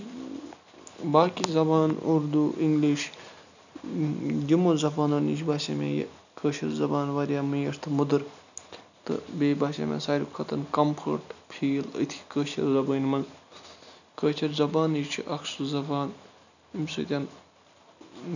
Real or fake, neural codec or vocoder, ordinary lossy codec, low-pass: real; none; none; 7.2 kHz